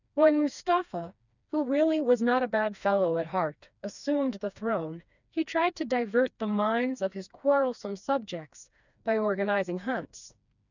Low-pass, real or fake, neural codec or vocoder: 7.2 kHz; fake; codec, 16 kHz, 2 kbps, FreqCodec, smaller model